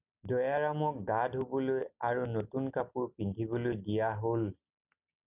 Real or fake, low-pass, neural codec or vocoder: real; 3.6 kHz; none